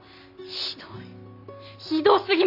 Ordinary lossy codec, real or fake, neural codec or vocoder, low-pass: none; real; none; 5.4 kHz